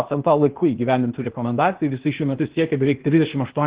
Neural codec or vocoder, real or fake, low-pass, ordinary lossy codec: codec, 16 kHz, 0.8 kbps, ZipCodec; fake; 3.6 kHz; Opus, 16 kbps